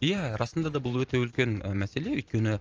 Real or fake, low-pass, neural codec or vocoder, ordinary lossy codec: fake; 7.2 kHz; vocoder, 44.1 kHz, 128 mel bands every 512 samples, BigVGAN v2; Opus, 24 kbps